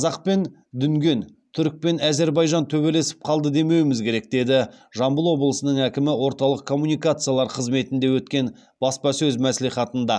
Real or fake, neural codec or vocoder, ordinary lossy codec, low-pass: real; none; none; none